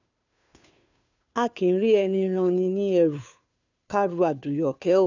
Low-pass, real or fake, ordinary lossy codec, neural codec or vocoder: 7.2 kHz; fake; none; codec, 16 kHz, 2 kbps, FunCodec, trained on Chinese and English, 25 frames a second